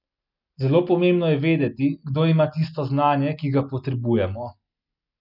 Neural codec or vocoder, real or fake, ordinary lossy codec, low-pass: none; real; none; 5.4 kHz